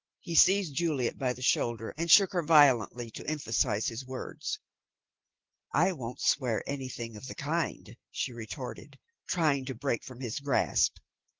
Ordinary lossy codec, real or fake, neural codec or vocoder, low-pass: Opus, 16 kbps; real; none; 7.2 kHz